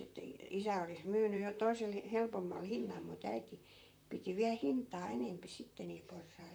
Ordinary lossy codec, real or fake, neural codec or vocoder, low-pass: none; fake; vocoder, 44.1 kHz, 128 mel bands, Pupu-Vocoder; none